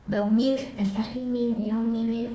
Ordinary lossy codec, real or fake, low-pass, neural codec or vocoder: none; fake; none; codec, 16 kHz, 1 kbps, FunCodec, trained on Chinese and English, 50 frames a second